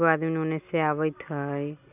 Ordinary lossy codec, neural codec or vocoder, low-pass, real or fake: none; none; 3.6 kHz; real